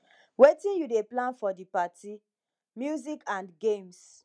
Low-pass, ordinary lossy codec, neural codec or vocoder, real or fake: 9.9 kHz; none; none; real